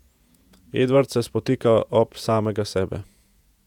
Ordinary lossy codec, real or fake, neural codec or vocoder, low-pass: none; fake; vocoder, 48 kHz, 128 mel bands, Vocos; 19.8 kHz